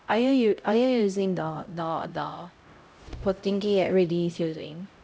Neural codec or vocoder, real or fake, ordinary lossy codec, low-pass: codec, 16 kHz, 0.5 kbps, X-Codec, HuBERT features, trained on LibriSpeech; fake; none; none